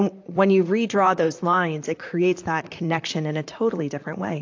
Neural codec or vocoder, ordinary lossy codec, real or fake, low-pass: vocoder, 44.1 kHz, 128 mel bands, Pupu-Vocoder; AAC, 48 kbps; fake; 7.2 kHz